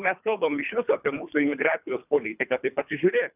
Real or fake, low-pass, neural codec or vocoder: fake; 3.6 kHz; codec, 24 kHz, 3 kbps, HILCodec